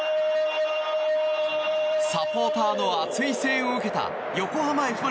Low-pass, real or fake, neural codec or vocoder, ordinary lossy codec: none; real; none; none